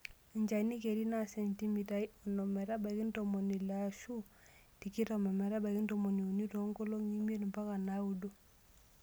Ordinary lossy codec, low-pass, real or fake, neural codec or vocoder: none; none; real; none